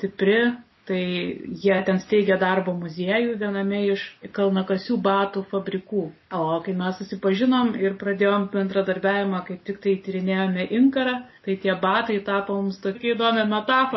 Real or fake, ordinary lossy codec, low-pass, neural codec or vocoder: real; MP3, 24 kbps; 7.2 kHz; none